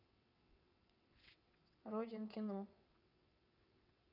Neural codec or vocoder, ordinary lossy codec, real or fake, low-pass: vocoder, 22.05 kHz, 80 mel bands, WaveNeXt; none; fake; 5.4 kHz